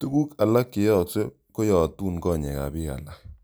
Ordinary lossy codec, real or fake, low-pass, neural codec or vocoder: none; real; none; none